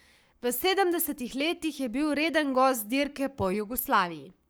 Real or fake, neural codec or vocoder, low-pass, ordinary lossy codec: fake; codec, 44.1 kHz, 7.8 kbps, Pupu-Codec; none; none